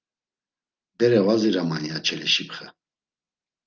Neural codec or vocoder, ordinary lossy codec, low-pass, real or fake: none; Opus, 24 kbps; 7.2 kHz; real